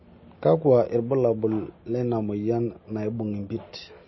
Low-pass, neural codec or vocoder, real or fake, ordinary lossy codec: 7.2 kHz; none; real; MP3, 24 kbps